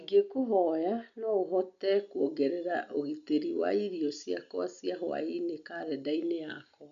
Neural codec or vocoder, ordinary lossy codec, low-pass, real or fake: none; none; 7.2 kHz; real